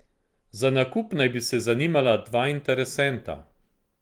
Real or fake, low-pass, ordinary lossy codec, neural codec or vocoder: fake; 19.8 kHz; Opus, 24 kbps; vocoder, 44.1 kHz, 128 mel bands every 512 samples, BigVGAN v2